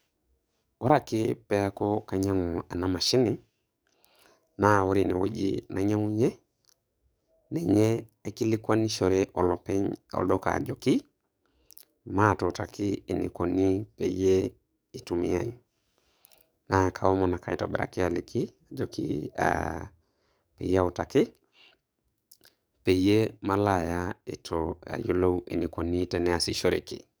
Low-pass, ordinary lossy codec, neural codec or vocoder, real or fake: none; none; codec, 44.1 kHz, 7.8 kbps, DAC; fake